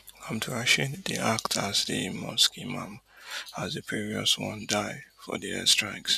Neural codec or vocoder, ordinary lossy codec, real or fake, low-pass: none; none; real; 14.4 kHz